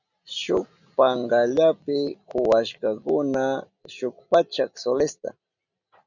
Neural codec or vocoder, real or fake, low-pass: none; real; 7.2 kHz